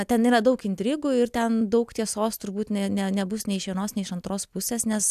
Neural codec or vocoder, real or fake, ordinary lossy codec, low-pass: none; real; AAC, 96 kbps; 14.4 kHz